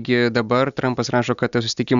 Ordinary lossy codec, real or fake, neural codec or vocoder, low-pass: Opus, 64 kbps; real; none; 7.2 kHz